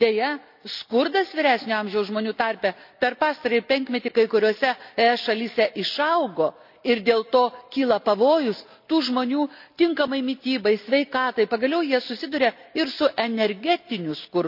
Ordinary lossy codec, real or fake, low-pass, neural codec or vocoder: none; real; 5.4 kHz; none